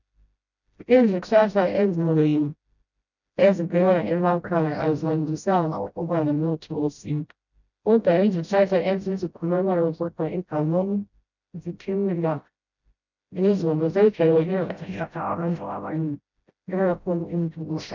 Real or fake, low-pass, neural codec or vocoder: fake; 7.2 kHz; codec, 16 kHz, 0.5 kbps, FreqCodec, smaller model